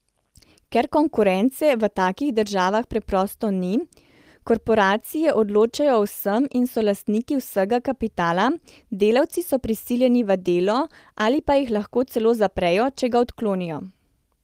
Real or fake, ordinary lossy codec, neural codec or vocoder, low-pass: real; Opus, 24 kbps; none; 14.4 kHz